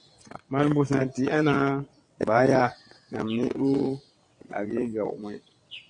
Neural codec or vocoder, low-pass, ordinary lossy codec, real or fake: vocoder, 22.05 kHz, 80 mel bands, WaveNeXt; 9.9 kHz; MP3, 48 kbps; fake